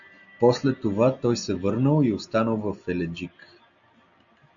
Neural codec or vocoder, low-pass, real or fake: none; 7.2 kHz; real